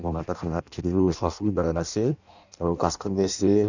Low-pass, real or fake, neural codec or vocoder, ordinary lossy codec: 7.2 kHz; fake; codec, 16 kHz in and 24 kHz out, 0.6 kbps, FireRedTTS-2 codec; none